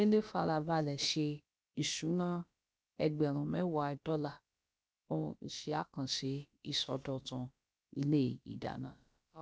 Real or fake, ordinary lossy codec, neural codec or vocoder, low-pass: fake; none; codec, 16 kHz, about 1 kbps, DyCAST, with the encoder's durations; none